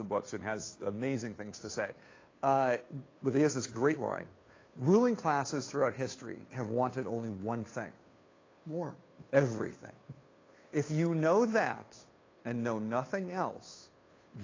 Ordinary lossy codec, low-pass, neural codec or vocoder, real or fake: AAC, 32 kbps; 7.2 kHz; codec, 16 kHz, 2 kbps, FunCodec, trained on Chinese and English, 25 frames a second; fake